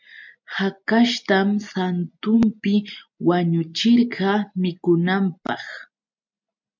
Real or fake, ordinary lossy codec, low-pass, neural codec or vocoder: real; MP3, 64 kbps; 7.2 kHz; none